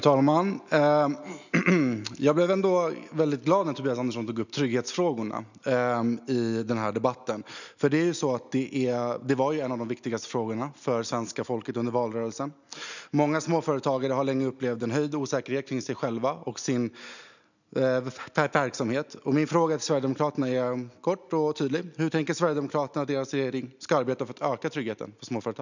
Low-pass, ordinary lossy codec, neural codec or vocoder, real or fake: 7.2 kHz; none; none; real